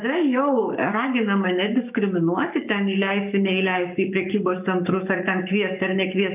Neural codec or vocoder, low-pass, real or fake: codec, 44.1 kHz, 7.8 kbps, Pupu-Codec; 3.6 kHz; fake